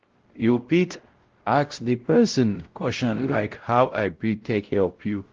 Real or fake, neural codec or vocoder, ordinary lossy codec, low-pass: fake; codec, 16 kHz, 0.5 kbps, X-Codec, WavLM features, trained on Multilingual LibriSpeech; Opus, 16 kbps; 7.2 kHz